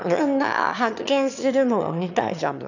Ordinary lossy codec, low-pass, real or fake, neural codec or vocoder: none; 7.2 kHz; fake; autoencoder, 22.05 kHz, a latent of 192 numbers a frame, VITS, trained on one speaker